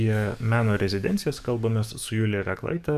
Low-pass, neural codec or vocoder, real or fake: 14.4 kHz; codec, 44.1 kHz, 7.8 kbps, DAC; fake